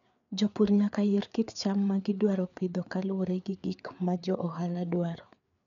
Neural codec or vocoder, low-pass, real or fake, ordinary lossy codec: codec, 16 kHz, 4 kbps, FreqCodec, larger model; 7.2 kHz; fake; MP3, 96 kbps